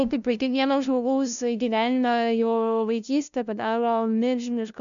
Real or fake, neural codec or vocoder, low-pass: fake; codec, 16 kHz, 0.5 kbps, FunCodec, trained on LibriTTS, 25 frames a second; 7.2 kHz